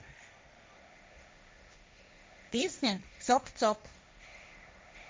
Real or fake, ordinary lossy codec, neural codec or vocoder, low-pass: fake; none; codec, 16 kHz, 1.1 kbps, Voila-Tokenizer; none